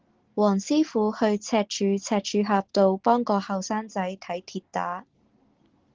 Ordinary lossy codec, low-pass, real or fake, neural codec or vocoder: Opus, 16 kbps; 7.2 kHz; real; none